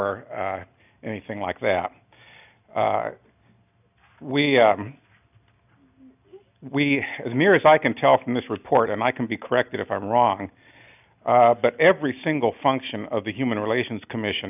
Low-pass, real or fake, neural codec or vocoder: 3.6 kHz; real; none